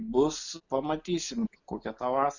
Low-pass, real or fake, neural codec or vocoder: 7.2 kHz; real; none